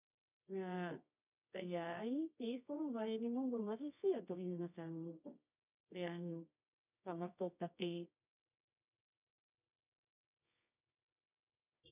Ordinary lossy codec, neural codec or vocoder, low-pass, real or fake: none; codec, 24 kHz, 0.9 kbps, WavTokenizer, medium music audio release; 3.6 kHz; fake